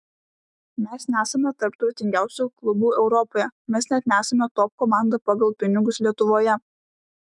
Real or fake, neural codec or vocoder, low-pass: fake; autoencoder, 48 kHz, 128 numbers a frame, DAC-VAE, trained on Japanese speech; 10.8 kHz